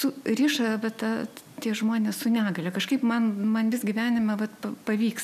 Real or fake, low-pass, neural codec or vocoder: real; 14.4 kHz; none